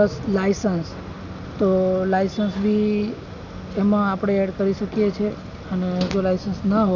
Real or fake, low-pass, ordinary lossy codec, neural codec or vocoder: real; 7.2 kHz; none; none